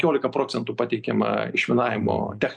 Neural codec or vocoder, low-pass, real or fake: none; 9.9 kHz; real